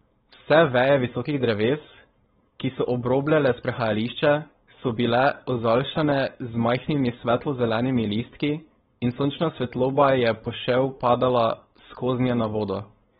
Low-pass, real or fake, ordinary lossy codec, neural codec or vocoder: 7.2 kHz; fake; AAC, 16 kbps; codec, 16 kHz, 4.8 kbps, FACodec